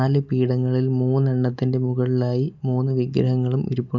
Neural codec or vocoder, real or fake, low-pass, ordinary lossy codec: none; real; 7.2 kHz; none